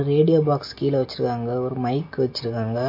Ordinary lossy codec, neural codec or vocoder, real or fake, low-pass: MP3, 32 kbps; none; real; 5.4 kHz